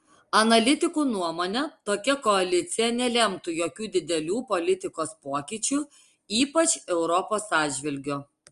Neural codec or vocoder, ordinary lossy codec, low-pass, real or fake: none; Opus, 32 kbps; 10.8 kHz; real